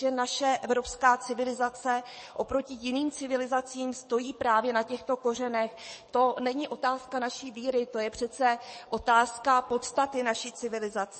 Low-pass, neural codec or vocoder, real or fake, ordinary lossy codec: 10.8 kHz; codec, 44.1 kHz, 7.8 kbps, DAC; fake; MP3, 32 kbps